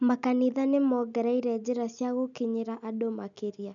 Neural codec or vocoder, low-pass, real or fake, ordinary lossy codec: none; 7.2 kHz; real; none